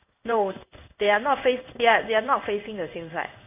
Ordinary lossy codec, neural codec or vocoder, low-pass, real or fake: none; codec, 16 kHz in and 24 kHz out, 1 kbps, XY-Tokenizer; 3.6 kHz; fake